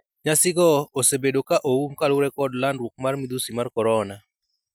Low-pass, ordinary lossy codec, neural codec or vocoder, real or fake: none; none; none; real